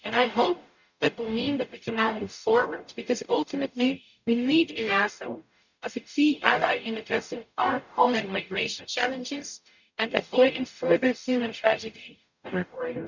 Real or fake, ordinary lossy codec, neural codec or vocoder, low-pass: fake; none; codec, 44.1 kHz, 0.9 kbps, DAC; 7.2 kHz